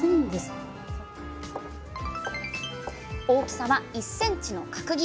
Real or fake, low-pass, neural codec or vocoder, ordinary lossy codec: real; none; none; none